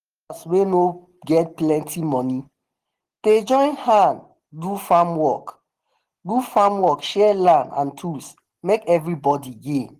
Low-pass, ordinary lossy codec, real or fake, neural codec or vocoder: 14.4 kHz; Opus, 16 kbps; real; none